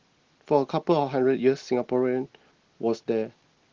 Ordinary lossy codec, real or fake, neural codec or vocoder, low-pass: Opus, 16 kbps; real; none; 7.2 kHz